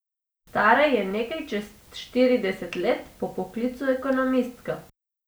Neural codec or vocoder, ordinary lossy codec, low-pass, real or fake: none; none; none; real